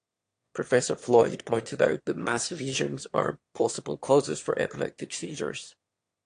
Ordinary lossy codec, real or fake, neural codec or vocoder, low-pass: AAC, 48 kbps; fake; autoencoder, 22.05 kHz, a latent of 192 numbers a frame, VITS, trained on one speaker; 9.9 kHz